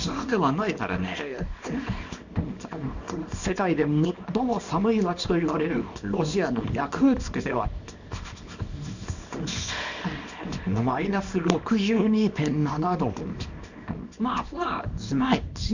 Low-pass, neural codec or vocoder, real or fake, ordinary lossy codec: 7.2 kHz; codec, 24 kHz, 0.9 kbps, WavTokenizer, small release; fake; none